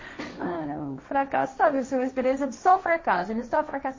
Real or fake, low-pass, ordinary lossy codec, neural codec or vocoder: fake; 7.2 kHz; MP3, 32 kbps; codec, 16 kHz, 1.1 kbps, Voila-Tokenizer